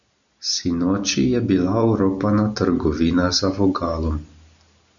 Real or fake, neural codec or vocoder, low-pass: real; none; 7.2 kHz